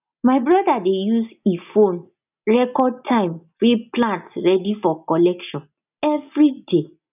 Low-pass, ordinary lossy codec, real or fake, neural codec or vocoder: 3.6 kHz; none; real; none